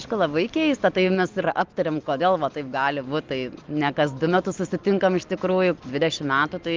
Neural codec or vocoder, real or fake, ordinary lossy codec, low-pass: none; real; Opus, 24 kbps; 7.2 kHz